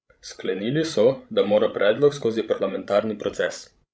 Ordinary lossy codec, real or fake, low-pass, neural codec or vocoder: none; fake; none; codec, 16 kHz, 16 kbps, FreqCodec, larger model